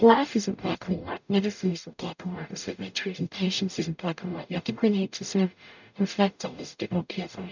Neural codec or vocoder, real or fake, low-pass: codec, 44.1 kHz, 0.9 kbps, DAC; fake; 7.2 kHz